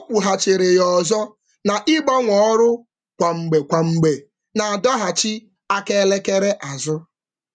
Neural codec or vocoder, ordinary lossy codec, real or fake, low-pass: none; none; real; 9.9 kHz